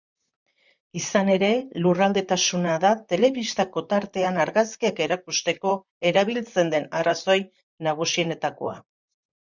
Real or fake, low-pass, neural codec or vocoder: fake; 7.2 kHz; vocoder, 44.1 kHz, 128 mel bands, Pupu-Vocoder